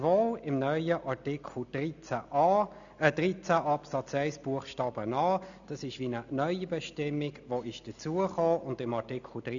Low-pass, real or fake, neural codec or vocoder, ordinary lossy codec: 7.2 kHz; real; none; none